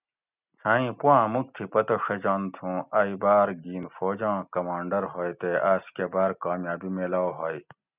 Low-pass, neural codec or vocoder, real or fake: 3.6 kHz; none; real